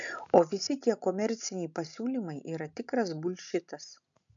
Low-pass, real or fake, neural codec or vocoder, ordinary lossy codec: 7.2 kHz; real; none; MP3, 96 kbps